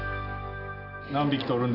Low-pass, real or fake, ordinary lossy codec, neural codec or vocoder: 5.4 kHz; real; none; none